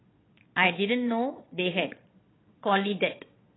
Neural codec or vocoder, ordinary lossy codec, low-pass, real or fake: vocoder, 22.05 kHz, 80 mel bands, Vocos; AAC, 16 kbps; 7.2 kHz; fake